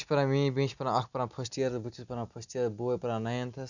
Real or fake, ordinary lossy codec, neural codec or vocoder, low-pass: real; none; none; 7.2 kHz